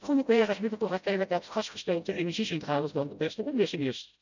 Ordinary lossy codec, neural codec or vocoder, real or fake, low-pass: none; codec, 16 kHz, 0.5 kbps, FreqCodec, smaller model; fake; 7.2 kHz